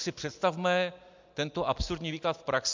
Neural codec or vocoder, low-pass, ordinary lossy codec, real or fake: none; 7.2 kHz; MP3, 64 kbps; real